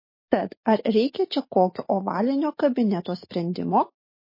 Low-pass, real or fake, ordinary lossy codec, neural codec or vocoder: 5.4 kHz; real; MP3, 24 kbps; none